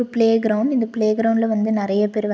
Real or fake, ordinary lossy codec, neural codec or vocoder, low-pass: real; none; none; none